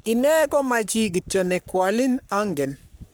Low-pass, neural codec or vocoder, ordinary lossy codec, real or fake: none; codec, 44.1 kHz, 3.4 kbps, Pupu-Codec; none; fake